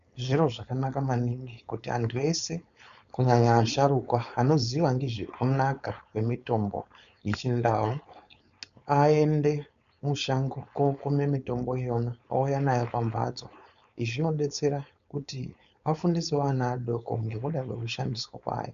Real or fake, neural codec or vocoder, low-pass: fake; codec, 16 kHz, 4.8 kbps, FACodec; 7.2 kHz